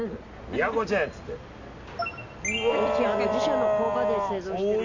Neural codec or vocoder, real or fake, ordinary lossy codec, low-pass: none; real; none; 7.2 kHz